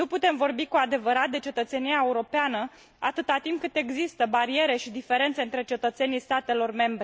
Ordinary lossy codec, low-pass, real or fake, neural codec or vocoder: none; none; real; none